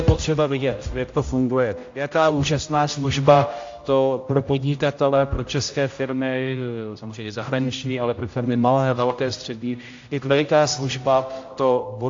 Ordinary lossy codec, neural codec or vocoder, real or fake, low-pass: AAC, 48 kbps; codec, 16 kHz, 0.5 kbps, X-Codec, HuBERT features, trained on general audio; fake; 7.2 kHz